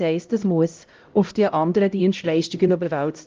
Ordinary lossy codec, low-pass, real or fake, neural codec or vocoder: Opus, 32 kbps; 7.2 kHz; fake; codec, 16 kHz, 0.5 kbps, X-Codec, HuBERT features, trained on LibriSpeech